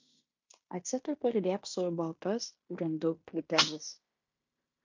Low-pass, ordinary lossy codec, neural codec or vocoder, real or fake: 7.2 kHz; MP3, 48 kbps; codec, 16 kHz in and 24 kHz out, 0.9 kbps, LongCat-Audio-Codec, fine tuned four codebook decoder; fake